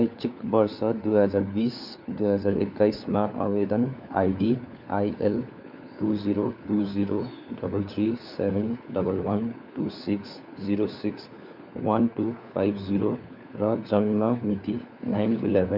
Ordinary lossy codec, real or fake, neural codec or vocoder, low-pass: none; fake; codec, 16 kHz, 4 kbps, FunCodec, trained on LibriTTS, 50 frames a second; 5.4 kHz